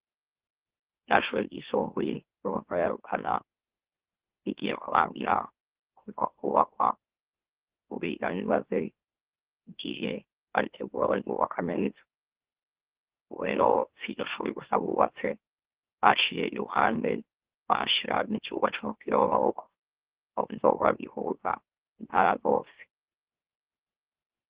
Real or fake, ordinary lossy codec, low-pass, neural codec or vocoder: fake; Opus, 16 kbps; 3.6 kHz; autoencoder, 44.1 kHz, a latent of 192 numbers a frame, MeloTTS